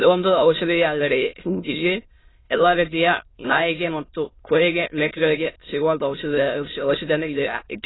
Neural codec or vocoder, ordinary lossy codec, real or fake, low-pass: autoencoder, 22.05 kHz, a latent of 192 numbers a frame, VITS, trained on many speakers; AAC, 16 kbps; fake; 7.2 kHz